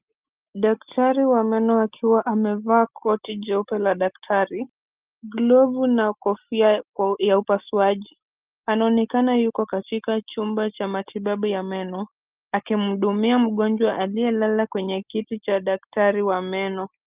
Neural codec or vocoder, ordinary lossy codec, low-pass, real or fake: none; Opus, 24 kbps; 3.6 kHz; real